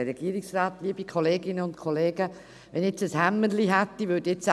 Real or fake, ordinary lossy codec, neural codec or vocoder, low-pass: real; none; none; none